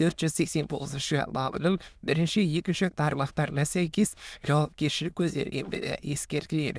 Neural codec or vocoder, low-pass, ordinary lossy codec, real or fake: autoencoder, 22.05 kHz, a latent of 192 numbers a frame, VITS, trained on many speakers; none; none; fake